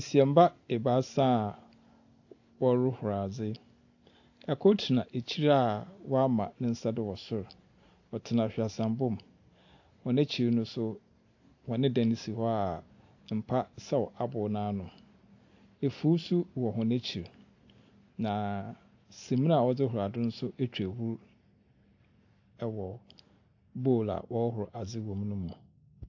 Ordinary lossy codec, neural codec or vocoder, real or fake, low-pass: AAC, 48 kbps; none; real; 7.2 kHz